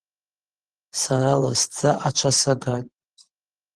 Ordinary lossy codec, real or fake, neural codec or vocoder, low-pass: Opus, 16 kbps; fake; vocoder, 44.1 kHz, 128 mel bands every 512 samples, BigVGAN v2; 10.8 kHz